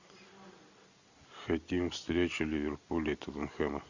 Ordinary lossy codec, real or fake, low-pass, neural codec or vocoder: Opus, 64 kbps; real; 7.2 kHz; none